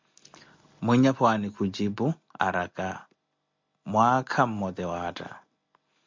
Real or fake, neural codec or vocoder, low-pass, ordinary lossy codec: real; none; 7.2 kHz; MP3, 64 kbps